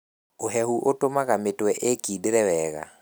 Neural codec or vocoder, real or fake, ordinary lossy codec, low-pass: none; real; none; none